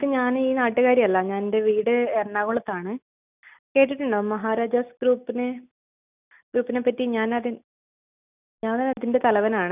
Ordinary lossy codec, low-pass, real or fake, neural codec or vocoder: none; 3.6 kHz; real; none